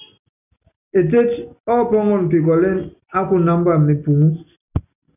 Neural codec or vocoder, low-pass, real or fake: none; 3.6 kHz; real